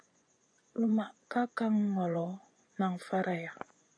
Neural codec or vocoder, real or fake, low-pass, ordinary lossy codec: vocoder, 24 kHz, 100 mel bands, Vocos; fake; 9.9 kHz; AAC, 48 kbps